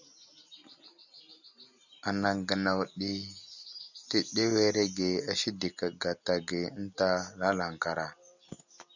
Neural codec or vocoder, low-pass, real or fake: none; 7.2 kHz; real